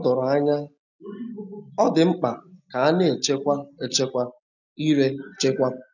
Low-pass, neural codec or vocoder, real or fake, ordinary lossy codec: 7.2 kHz; none; real; none